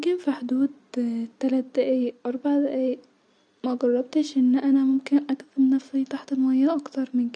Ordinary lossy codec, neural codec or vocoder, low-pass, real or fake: none; none; 9.9 kHz; real